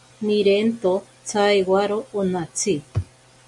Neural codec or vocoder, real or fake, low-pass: none; real; 10.8 kHz